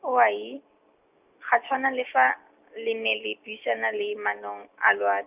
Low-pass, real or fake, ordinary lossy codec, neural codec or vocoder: 3.6 kHz; real; none; none